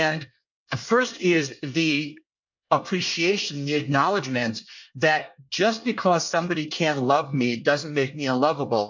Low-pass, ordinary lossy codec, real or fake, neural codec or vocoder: 7.2 kHz; MP3, 48 kbps; fake; codec, 24 kHz, 1 kbps, SNAC